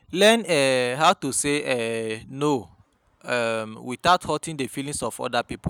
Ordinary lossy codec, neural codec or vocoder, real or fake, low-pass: none; none; real; none